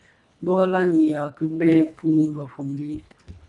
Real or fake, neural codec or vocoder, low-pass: fake; codec, 24 kHz, 1.5 kbps, HILCodec; 10.8 kHz